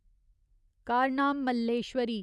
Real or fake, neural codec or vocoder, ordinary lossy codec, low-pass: real; none; none; none